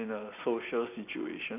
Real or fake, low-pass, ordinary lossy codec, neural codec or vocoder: real; 3.6 kHz; none; none